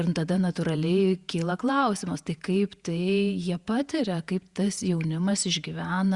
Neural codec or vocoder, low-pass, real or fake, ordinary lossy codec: vocoder, 48 kHz, 128 mel bands, Vocos; 10.8 kHz; fake; Opus, 64 kbps